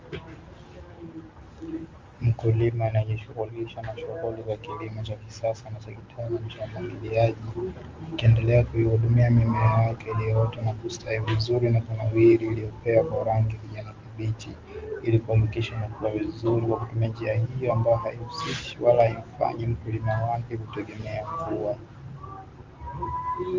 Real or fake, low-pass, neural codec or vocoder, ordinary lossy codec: real; 7.2 kHz; none; Opus, 32 kbps